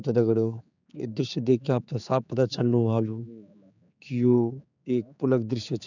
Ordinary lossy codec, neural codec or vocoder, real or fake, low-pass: none; codec, 16 kHz, 4 kbps, X-Codec, HuBERT features, trained on general audio; fake; 7.2 kHz